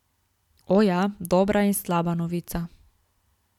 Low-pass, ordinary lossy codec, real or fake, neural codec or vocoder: 19.8 kHz; none; real; none